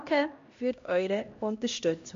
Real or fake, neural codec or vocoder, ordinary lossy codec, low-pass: fake; codec, 16 kHz, 1 kbps, X-Codec, HuBERT features, trained on LibriSpeech; MP3, 48 kbps; 7.2 kHz